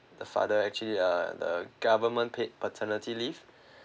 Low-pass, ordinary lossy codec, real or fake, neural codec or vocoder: none; none; real; none